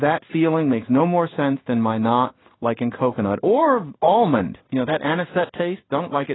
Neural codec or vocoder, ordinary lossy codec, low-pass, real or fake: codec, 16 kHz in and 24 kHz out, 1 kbps, XY-Tokenizer; AAC, 16 kbps; 7.2 kHz; fake